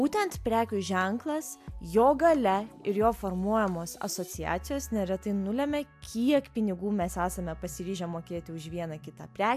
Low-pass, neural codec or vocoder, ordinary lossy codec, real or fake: 14.4 kHz; none; AAC, 96 kbps; real